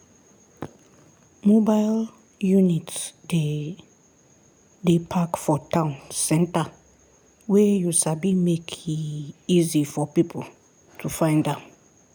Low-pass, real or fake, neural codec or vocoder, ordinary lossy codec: none; real; none; none